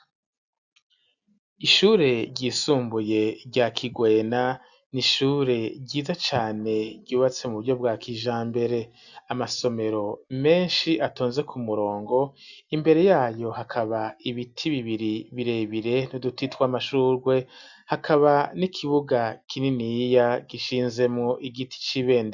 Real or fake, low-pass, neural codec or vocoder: real; 7.2 kHz; none